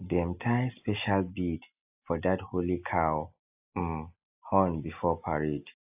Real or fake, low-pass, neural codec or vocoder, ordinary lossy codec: real; 3.6 kHz; none; AAC, 32 kbps